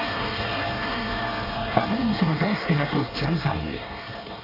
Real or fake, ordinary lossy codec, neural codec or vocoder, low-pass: fake; AAC, 24 kbps; codec, 24 kHz, 1 kbps, SNAC; 5.4 kHz